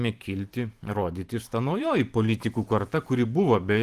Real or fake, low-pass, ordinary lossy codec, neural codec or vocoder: fake; 14.4 kHz; Opus, 24 kbps; vocoder, 44.1 kHz, 128 mel bands every 512 samples, BigVGAN v2